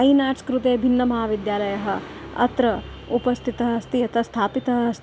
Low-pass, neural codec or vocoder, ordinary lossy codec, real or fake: none; none; none; real